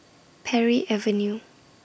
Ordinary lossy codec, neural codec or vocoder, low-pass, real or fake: none; none; none; real